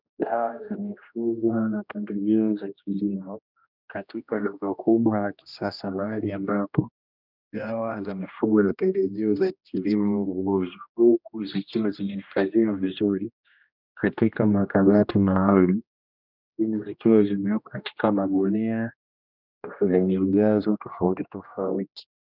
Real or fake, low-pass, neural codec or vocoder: fake; 5.4 kHz; codec, 16 kHz, 1 kbps, X-Codec, HuBERT features, trained on general audio